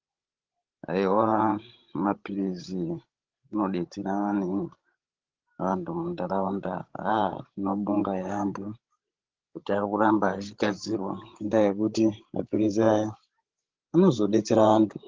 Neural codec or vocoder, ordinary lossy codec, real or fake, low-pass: codec, 16 kHz, 16 kbps, FreqCodec, larger model; Opus, 16 kbps; fake; 7.2 kHz